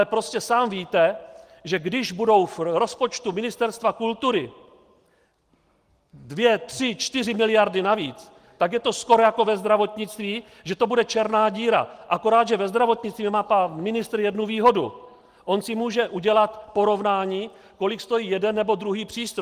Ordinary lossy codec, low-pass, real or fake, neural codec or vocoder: Opus, 24 kbps; 14.4 kHz; real; none